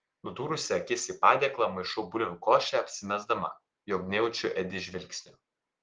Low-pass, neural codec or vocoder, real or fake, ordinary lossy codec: 7.2 kHz; none; real; Opus, 16 kbps